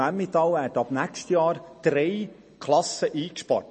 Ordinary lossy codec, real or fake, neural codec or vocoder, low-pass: MP3, 32 kbps; real; none; 10.8 kHz